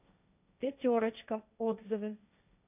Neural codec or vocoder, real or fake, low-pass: codec, 16 kHz, 1.1 kbps, Voila-Tokenizer; fake; 3.6 kHz